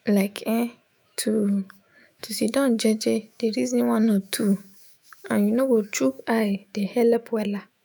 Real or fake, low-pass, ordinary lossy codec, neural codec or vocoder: fake; none; none; autoencoder, 48 kHz, 128 numbers a frame, DAC-VAE, trained on Japanese speech